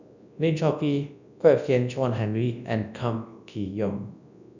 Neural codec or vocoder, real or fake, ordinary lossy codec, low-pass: codec, 24 kHz, 0.9 kbps, WavTokenizer, large speech release; fake; none; 7.2 kHz